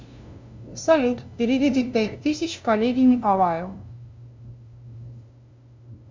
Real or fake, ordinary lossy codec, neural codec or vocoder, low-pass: fake; MP3, 64 kbps; codec, 16 kHz, 0.5 kbps, FunCodec, trained on LibriTTS, 25 frames a second; 7.2 kHz